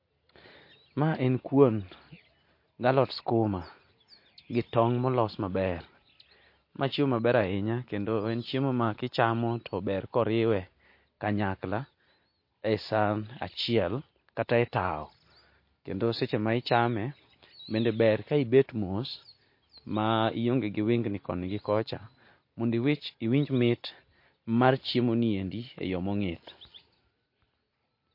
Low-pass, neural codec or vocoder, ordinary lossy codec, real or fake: 5.4 kHz; none; MP3, 32 kbps; real